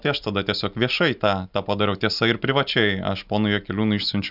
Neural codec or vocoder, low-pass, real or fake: none; 5.4 kHz; real